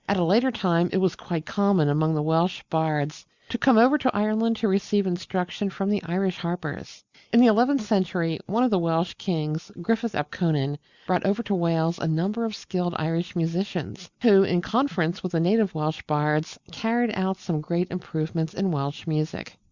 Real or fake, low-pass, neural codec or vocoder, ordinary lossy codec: real; 7.2 kHz; none; Opus, 64 kbps